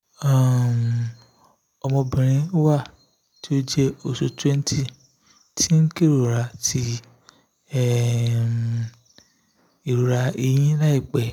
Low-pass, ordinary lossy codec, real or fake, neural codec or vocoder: 19.8 kHz; none; real; none